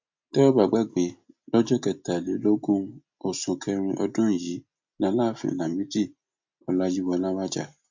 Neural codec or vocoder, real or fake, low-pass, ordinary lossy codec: none; real; 7.2 kHz; MP3, 48 kbps